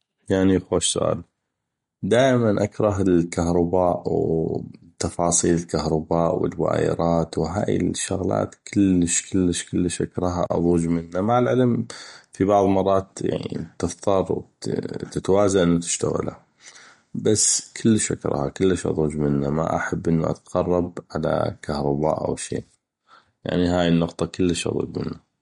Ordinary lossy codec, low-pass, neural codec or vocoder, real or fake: MP3, 48 kbps; 19.8 kHz; none; real